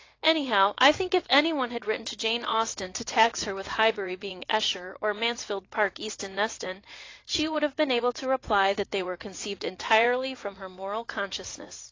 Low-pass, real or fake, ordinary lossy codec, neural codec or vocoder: 7.2 kHz; fake; AAC, 32 kbps; vocoder, 44.1 kHz, 80 mel bands, Vocos